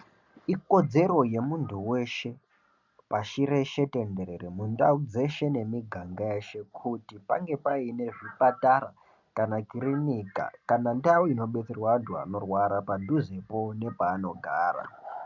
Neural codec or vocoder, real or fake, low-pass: none; real; 7.2 kHz